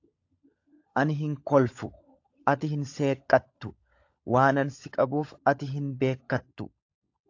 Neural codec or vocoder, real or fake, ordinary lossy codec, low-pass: codec, 16 kHz, 16 kbps, FunCodec, trained on LibriTTS, 50 frames a second; fake; AAC, 48 kbps; 7.2 kHz